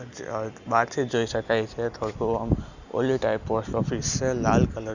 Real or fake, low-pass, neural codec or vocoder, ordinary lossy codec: real; 7.2 kHz; none; none